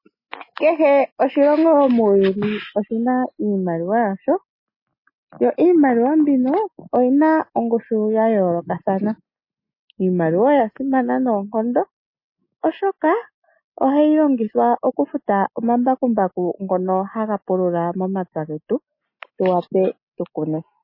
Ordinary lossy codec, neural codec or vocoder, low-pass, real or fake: MP3, 24 kbps; none; 5.4 kHz; real